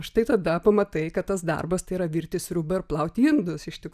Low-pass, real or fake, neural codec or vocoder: 14.4 kHz; real; none